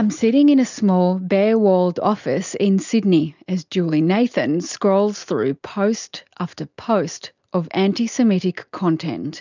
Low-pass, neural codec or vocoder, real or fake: 7.2 kHz; none; real